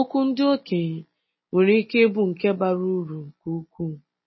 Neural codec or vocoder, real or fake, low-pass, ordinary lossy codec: none; real; 7.2 kHz; MP3, 24 kbps